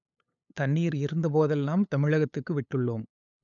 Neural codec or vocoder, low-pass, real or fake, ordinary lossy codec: codec, 16 kHz, 8 kbps, FunCodec, trained on LibriTTS, 25 frames a second; 7.2 kHz; fake; none